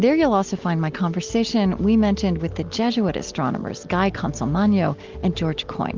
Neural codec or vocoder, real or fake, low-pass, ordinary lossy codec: none; real; 7.2 kHz; Opus, 32 kbps